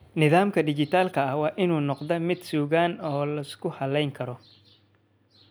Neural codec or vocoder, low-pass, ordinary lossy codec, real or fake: none; none; none; real